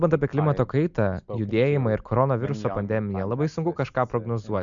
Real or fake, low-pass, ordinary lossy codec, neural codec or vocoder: real; 7.2 kHz; MP3, 64 kbps; none